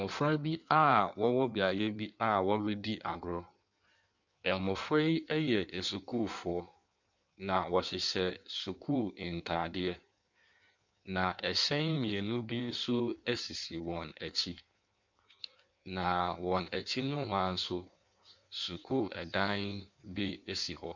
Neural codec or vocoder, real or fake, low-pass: codec, 16 kHz in and 24 kHz out, 1.1 kbps, FireRedTTS-2 codec; fake; 7.2 kHz